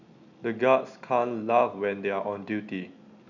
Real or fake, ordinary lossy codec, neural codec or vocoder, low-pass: real; none; none; 7.2 kHz